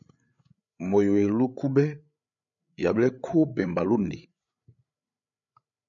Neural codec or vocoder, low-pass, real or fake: codec, 16 kHz, 8 kbps, FreqCodec, larger model; 7.2 kHz; fake